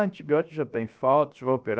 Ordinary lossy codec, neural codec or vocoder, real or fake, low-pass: none; codec, 16 kHz, 0.7 kbps, FocalCodec; fake; none